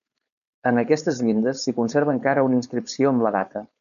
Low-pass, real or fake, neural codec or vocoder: 7.2 kHz; fake; codec, 16 kHz, 4.8 kbps, FACodec